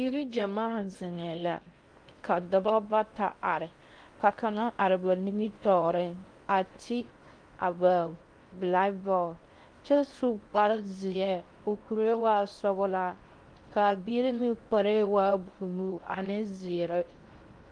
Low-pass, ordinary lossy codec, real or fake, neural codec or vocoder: 9.9 kHz; Opus, 32 kbps; fake; codec, 16 kHz in and 24 kHz out, 0.6 kbps, FocalCodec, streaming, 2048 codes